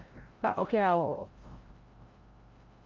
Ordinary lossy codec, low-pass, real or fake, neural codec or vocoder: Opus, 32 kbps; 7.2 kHz; fake; codec, 16 kHz, 0.5 kbps, FreqCodec, larger model